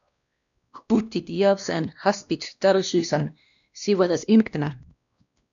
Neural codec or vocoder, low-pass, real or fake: codec, 16 kHz, 1 kbps, X-Codec, WavLM features, trained on Multilingual LibriSpeech; 7.2 kHz; fake